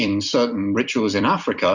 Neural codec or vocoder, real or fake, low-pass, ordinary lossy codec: vocoder, 44.1 kHz, 128 mel bands every 256 samples, BigVGAN v2; fake; 7.2 kHz; Opus, 64 kbps